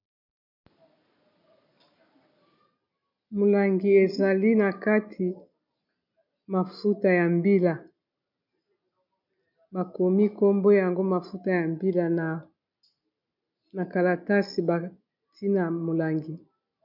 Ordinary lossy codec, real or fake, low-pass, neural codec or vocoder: MP3, 48 kbps; real; 5.4 kHz; none